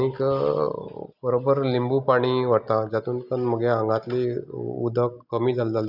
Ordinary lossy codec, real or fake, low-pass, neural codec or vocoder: none; real; 5.4 kHz; none